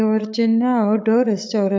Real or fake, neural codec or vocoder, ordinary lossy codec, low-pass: fake; codec, 16 kHz, 16 kbps, FreqCodec, larger model; none; none